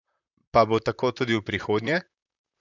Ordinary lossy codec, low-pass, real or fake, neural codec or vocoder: none; 7.2 kHz; fake; vocoder, 44.1 kHz, 128 mel bands, Pupu-Vocoder